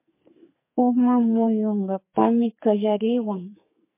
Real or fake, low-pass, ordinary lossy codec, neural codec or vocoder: fake; 3.6 kHz; MP3, 24 kbps; codec, 44.1 kHz, 2.6 kbps, SNAC